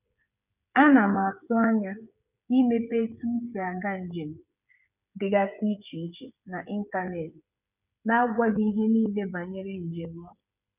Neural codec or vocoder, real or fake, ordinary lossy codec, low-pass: codec, 16 kHz, 8 kbps, FreqCodec, smaller model; fake; none; 3.6 kHz